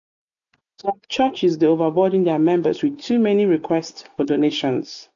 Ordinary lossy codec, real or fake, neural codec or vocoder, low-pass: none; real; none; 7.2 kHz